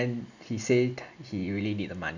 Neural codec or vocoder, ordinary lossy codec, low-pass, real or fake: none; none; 7.2 kHz; real